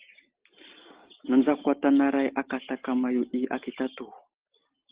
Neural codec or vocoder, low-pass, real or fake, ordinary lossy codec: none; 3.6 kHz; real; Opus, 16 kbps